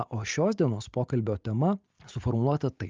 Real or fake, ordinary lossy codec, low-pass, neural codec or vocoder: real; Opus, 24 kbps; 7.2 kHz; none